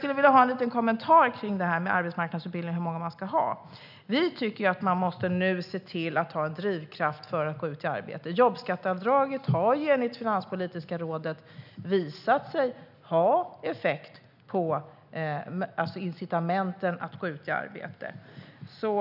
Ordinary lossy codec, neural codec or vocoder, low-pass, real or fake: none; none; 5.4 kHz; real